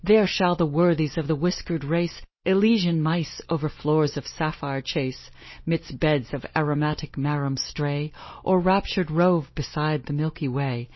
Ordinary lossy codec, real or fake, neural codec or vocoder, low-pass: MP3, 24 kbps; real; none; 7.2 kHz